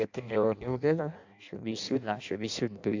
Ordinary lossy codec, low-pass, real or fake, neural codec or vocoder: AAC, 48 kbps; 7.2 kHz; fake; codec, 16 kHz in and 24 kHz out, 0.6 kbps, FireRedTTS-2 codec